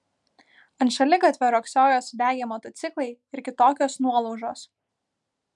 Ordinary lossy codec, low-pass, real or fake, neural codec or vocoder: MP3, 96 kbps; 10.8 kHz; real; none